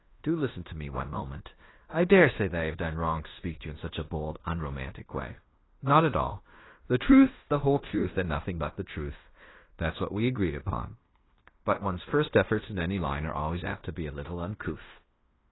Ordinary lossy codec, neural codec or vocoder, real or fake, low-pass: AAC, 16 kbps; codec, 16 kHz in and 24 kHz out, 0.9 kbps, LongCat-Audio-Codec, fine tuned four codebook decoder; fake; 7.2 kHz